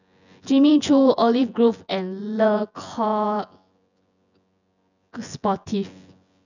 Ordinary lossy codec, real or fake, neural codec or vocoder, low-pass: none; fake; vocoder, 24 kHz, 100 mel bands, Vocos; 7.2 kHz